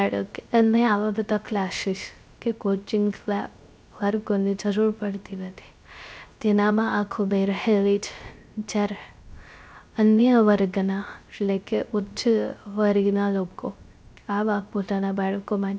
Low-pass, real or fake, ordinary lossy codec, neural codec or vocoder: none; fake; none; codec, 16 kHz, 0.3 kbps, FocalCodec